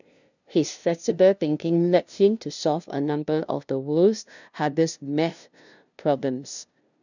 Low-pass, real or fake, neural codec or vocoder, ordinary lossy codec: 7.2 kHz; fake; codec, 16 kHz, 0.5 kbps, FunCodec, trained on LibriTTS, 25 frames a second; none